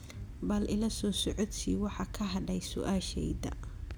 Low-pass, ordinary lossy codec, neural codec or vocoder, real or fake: none; none; none; real